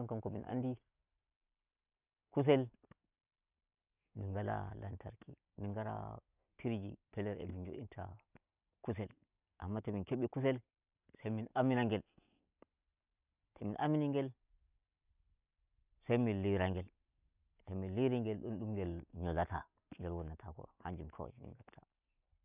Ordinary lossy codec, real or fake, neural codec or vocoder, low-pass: none; real; none; 3.6 kHz